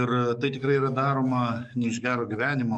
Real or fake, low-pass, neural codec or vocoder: fake; 9.9 kHz; codec, 44.1 kHz, 7.8 kbps, DAC